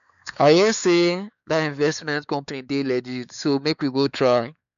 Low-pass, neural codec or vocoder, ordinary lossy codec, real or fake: 7.2 kHz; codec, 16 kHz, 4 kbps, X-Codec, WavLM features, trained on Multilingual LibriSpeech; none; fake